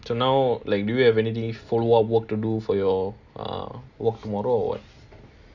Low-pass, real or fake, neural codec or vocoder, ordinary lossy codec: 7.2 kHz; real; none; Opus, 64 kbps